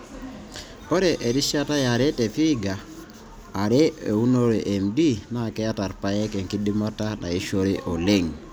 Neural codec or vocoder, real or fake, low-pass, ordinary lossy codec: none; real; none; none